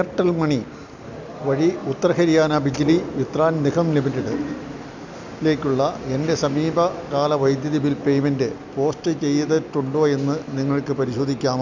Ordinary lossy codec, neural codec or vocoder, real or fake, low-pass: none; none; real; 7.2 kHz